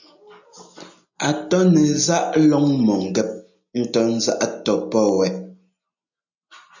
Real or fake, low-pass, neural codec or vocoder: real; 7.2 kHz; none